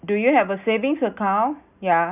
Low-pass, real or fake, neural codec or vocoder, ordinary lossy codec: 3.6 kHz; real; none; none